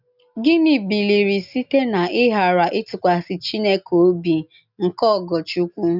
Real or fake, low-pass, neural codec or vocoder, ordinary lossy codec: real; 5.4 kHz; none; none